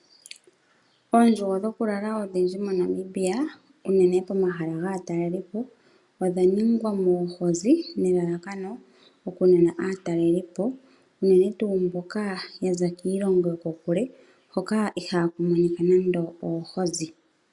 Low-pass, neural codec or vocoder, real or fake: 10.8 kHz; none; real